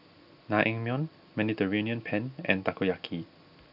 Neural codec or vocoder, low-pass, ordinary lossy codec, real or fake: none; 5.4 kHz; none; real